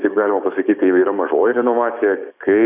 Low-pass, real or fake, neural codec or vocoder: 3.6 kHz; fake; codec, 16 kHz, 6 kbps, DAC